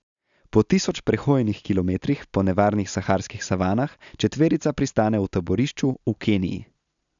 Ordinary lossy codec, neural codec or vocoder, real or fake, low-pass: MP3, 96 kbps; none; real; 7.2 kHz